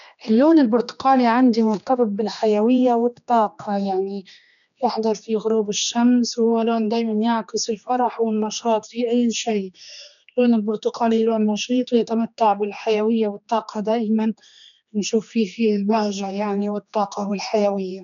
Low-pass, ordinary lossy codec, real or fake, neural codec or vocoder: 7.2 kHz; none; fake; codec, 16 kHz, 2 kbps, X-Codec, HuBERT features, trained on general audio